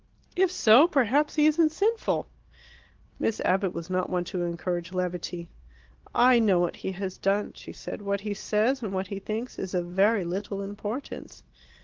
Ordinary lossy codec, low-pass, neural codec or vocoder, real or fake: Opus, 16 kbps; 7.2 kHz; none; real